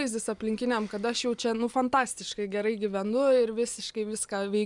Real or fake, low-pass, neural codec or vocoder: real; 10.8 kHz; none